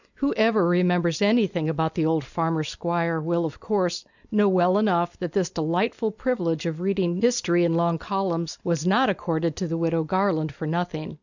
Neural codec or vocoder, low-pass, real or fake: none; 7.2 kHz; real